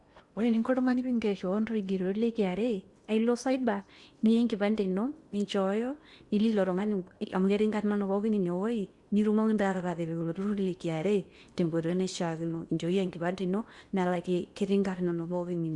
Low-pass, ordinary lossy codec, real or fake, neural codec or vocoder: 10.8 kHz; Opus, 64 kbps; fake; codec, 16 kHz in and 24 kHz out, 0.8 kbps, FocalCodec, streaming, 65536 codes